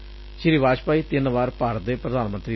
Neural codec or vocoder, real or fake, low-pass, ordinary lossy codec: none; real; 7.2 kHz; MP3, 24 kbps